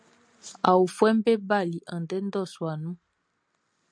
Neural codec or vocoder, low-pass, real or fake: none; 9.9 kHz; real